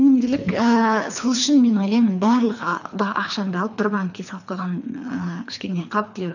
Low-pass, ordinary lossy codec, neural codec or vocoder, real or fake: 7.2 kHz; none; codec, 24 kHz, 3 kbps, HILCodec; fake